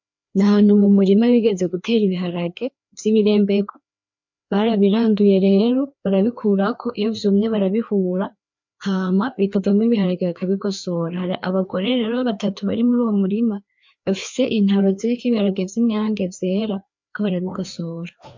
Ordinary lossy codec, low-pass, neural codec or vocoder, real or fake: MP3, 48 kbps; 7.2 kHz; codec, 16 kHz, 2 kbps, FreqCodec, larger model; fake